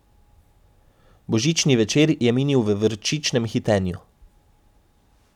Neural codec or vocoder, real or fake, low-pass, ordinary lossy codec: none; real; 19.8 kHz; none